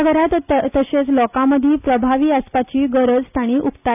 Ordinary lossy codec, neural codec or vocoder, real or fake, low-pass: none; none; real; 3.6 kHz